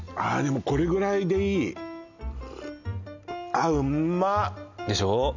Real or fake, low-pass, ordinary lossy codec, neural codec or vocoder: real; 7.2 kHz; none; none